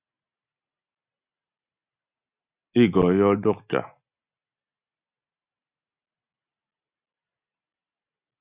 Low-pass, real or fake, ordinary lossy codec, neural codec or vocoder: 3.6 kHz; real; Opus, 64 kbps; none